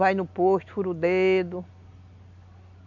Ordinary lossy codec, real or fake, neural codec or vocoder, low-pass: none; real; none; 7.2 kHz